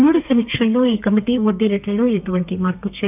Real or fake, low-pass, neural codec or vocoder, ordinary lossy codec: fake; 3.6 kHz; codec, 32 kHz, 1.9 kbps, SNAC; none